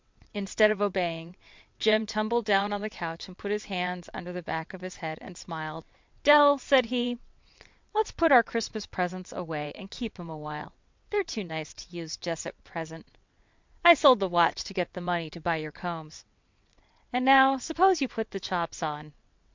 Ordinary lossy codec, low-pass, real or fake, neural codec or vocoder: MP3, 64 kbps; 7.2 kHz; fake; vocoder, 22.05 kHz, 80 mel bands, WaveNeXt